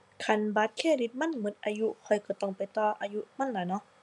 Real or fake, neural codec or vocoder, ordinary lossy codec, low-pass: real; none; none; 10.8 kHz